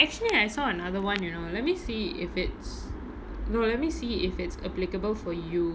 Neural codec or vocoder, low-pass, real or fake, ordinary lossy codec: none; none; real; none